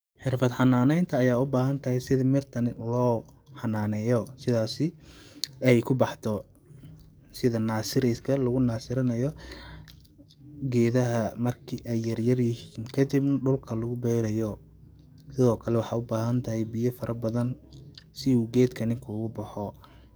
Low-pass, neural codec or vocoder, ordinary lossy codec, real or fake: none; codec, 44.1 kHz, 7.8 kbps, DAC; none; fake